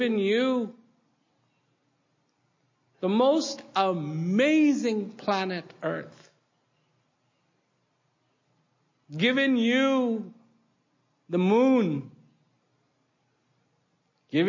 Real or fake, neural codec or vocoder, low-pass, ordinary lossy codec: real; none; 7.2 kHz; MP3, 32 kbps